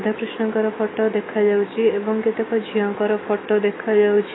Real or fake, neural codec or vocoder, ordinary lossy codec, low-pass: fake; autoencoder, 48 kHz, 128 numbers a frame, DAC-VAE, trained on Japanese speech; AAC, 16 kbps; 7.2 kHz